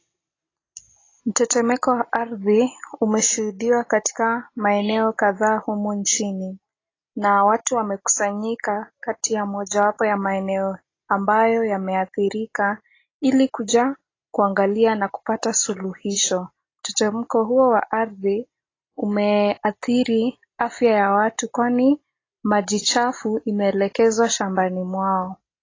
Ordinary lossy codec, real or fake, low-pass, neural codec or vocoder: AAC, 32 kbps; real; 7.2 kHz; none